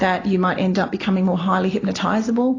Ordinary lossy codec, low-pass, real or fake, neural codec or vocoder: AAC, 32 kbps; 7.2 kHz; real; none